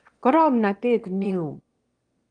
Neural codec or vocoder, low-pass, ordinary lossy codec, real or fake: autoencoder, 22.05 kHz, a latent of 192 numbers a frame, VITS, trained on one speaker; 9.9 kHz; Opus, 24 kbps; fake